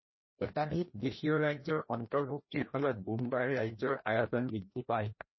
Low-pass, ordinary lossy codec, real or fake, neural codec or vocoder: 7.2 kHz; MP3, 24 kbps; fake; codec, 16 kHz, 1 kbps, FreqCodec, larger model